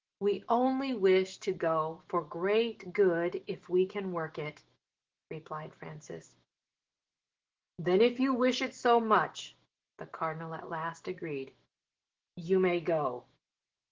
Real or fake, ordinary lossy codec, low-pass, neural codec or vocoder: fake; Opus, 16 kbps; 7.2 kHz; autoencoder, 48 kHz, 128 numbers a frame, DAC-VAE, trained on Japanese speech